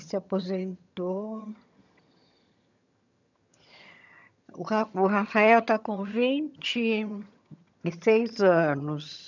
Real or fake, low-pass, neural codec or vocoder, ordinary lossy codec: fake; 7.2 kHz; vocoder, 22.05 kHz, 80 mel bands, HiFi-GAN; none